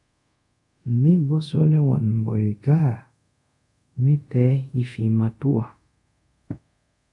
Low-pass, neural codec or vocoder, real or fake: 10.8 kHz; codec, 24 kHz, 0.5 kbps, DualCodec; fake